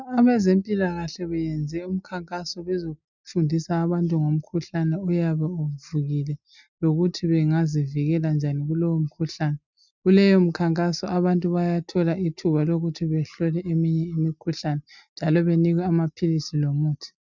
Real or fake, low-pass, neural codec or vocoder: real; 7.2 kHz; none